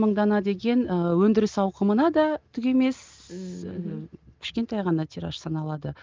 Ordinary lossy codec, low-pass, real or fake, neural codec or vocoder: Opus, 24 kbps; 7.2 kHz; real; none